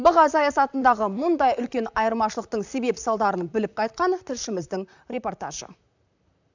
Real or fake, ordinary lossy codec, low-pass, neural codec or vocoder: fake; none; 7.2 kHz; vocoder, 44.1 kHz, 128 mel bands, Pupu-Vocoder